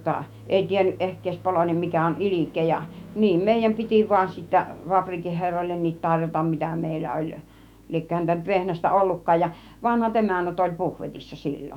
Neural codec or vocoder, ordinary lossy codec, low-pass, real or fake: autoencoder, 48 kHz, 128 numbers a frame, DAC-VAE, trained on Japanese speech; none; 19.8 kHz; fake